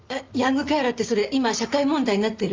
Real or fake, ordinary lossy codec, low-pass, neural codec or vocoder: real; Opus, 32 kbps; 7.2 kHz; none